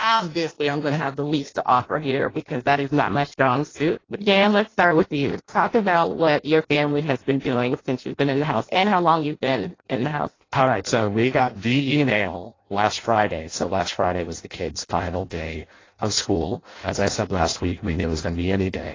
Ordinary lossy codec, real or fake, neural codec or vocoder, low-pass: AAC, 32 kbps; fake; codec, 16 kHz in and 24 kHz out, 0.6 kbps, FireRedTTS-2 codec; 7.2 kHz